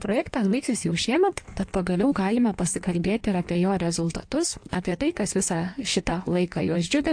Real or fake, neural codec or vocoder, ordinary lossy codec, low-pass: fake; codec, 16 kHz in and 24 kHz out, 1.1 kbps, FireRedTTS-2 codec; AAC, 64 kbps; 9.9 kHz